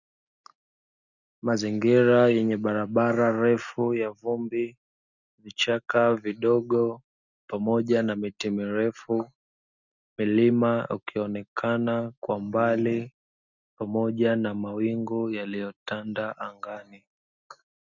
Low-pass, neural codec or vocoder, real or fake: 7.2 kHz; none; real